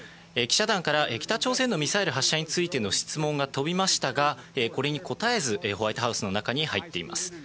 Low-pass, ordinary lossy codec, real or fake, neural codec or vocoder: none; none; real; none